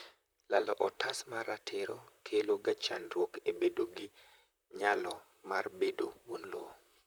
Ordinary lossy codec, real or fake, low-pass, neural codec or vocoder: none; fake; none; vocoder, 44.1 kHz, 128 mel bands, Pupu-Vocoder